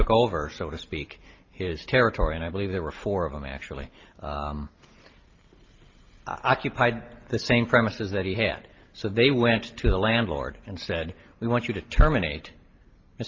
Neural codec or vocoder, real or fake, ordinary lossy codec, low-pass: none; real; Opus, 24 kbps; 7.2 kHz